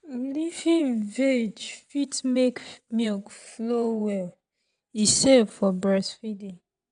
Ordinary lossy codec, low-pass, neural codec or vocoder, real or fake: none; 9.9 kHz; vocoder, 22.05 kHz, 80 mel bands, WaveNeXt; fake